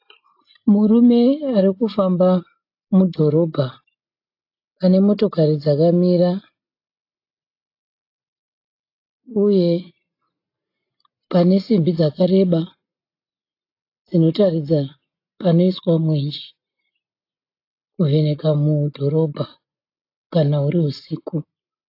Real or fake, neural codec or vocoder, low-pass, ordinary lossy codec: real; none; 5.4 kHz; AAC, 32 kbps